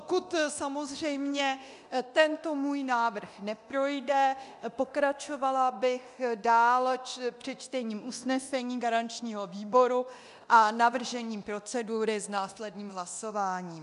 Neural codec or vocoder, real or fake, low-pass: codec, 24 kHz, 0.9 kbps, DualCodec; fake; 10.8 kHz